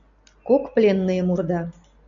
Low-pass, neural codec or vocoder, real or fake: 7.2 kHz; none; real